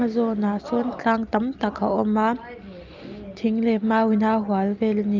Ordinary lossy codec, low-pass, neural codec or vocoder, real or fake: Opus, 32 kbps; 7.2 kHz; none; real